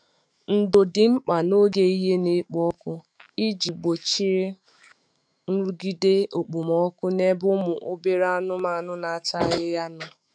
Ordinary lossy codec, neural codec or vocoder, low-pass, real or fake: none; autoencoder, 48 kHz, 128 numbers a frame, DAC-VAE, trained on Japanese speech; 9.9 kHz; fake